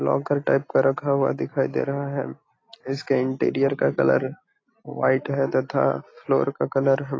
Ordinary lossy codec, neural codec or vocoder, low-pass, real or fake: AAC, 32 kbps; none; 7.2 kHz; real